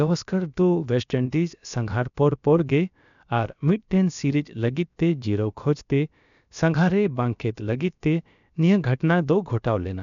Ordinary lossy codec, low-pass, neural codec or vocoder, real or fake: MP3, 96 kbps; 7.2 kHz; codec, 16 kHz, about 1 kbps, DyCAST, with the encoder's durations; fake